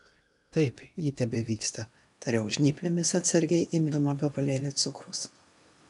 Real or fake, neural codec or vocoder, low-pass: fake; codec, 16 kHz in and 24 kHz out, 0.8 kbps, FocalCodec, streaming, 65536 codes; 10.8 kHz